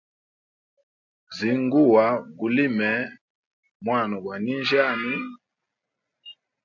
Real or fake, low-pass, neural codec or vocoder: real; 7.2 kHz; none